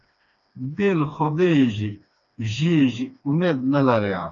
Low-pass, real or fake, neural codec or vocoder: 7.2 kHz; fake; codec, 16 kHz, 2 kbps, FreqCodec, smaller model